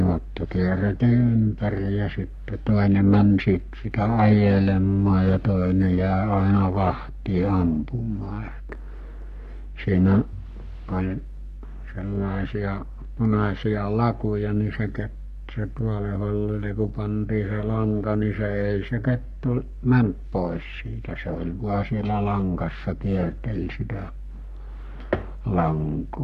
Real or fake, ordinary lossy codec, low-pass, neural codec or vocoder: fake; none; 14.4 kHz; codec, 44.1 kHz, 3.4 kbps, Pupu-Codec